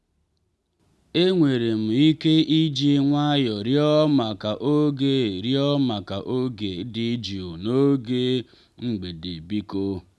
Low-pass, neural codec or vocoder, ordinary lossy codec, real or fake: none; none; none; real